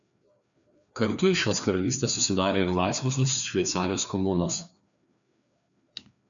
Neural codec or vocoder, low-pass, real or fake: codec, 16 kHz, 2 kbps, FreqCodec, larger model; 7.2 kHz; fake